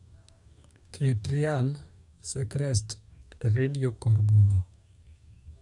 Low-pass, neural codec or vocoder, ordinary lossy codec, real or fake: 10.8 kHz; codec, 44.1 kHz, 2.6 kbps, DAC; none; fake